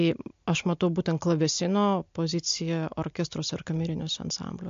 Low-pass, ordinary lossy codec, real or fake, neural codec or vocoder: 7.2 kHz; MP3, 48 kbps; real; none